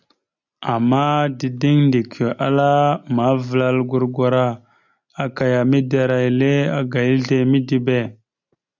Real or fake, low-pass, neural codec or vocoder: real; 7.2 kHz; none